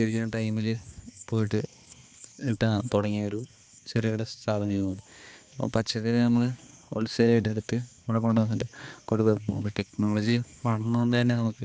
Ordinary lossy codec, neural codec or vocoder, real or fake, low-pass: none; codec, 16 kHz, 2 kbps, X-Codec, HuBERT features, trained on balanced general audio; fake; none